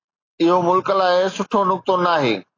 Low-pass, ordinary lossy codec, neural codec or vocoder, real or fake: 7.2 kHz; AAC, 32 kbps; none; real